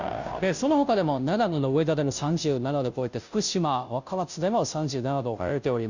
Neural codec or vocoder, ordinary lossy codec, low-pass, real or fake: codec, 16 kHz, 0.5 kbps, FunCodec, trained on Chinese and English, 25 frames a second; none; 7.2 kHz; fake